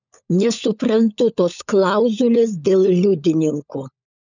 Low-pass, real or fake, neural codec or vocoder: 7.2 kHz; fake; codec, 16 kHz, 16 kbps, FunCodec, trained on LibriTTS, 50 frames a second